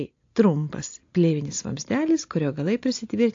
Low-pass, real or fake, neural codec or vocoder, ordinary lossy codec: 7.2 kHz; real; none; AAC, 48 kbps